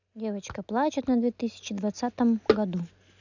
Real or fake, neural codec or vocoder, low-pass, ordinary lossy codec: real; none; 7.2 kHz; none